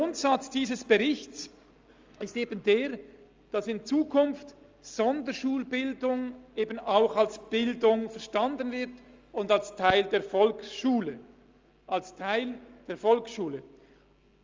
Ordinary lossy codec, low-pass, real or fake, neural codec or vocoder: Opus, 32 kbps; 7.2 kHz; real; none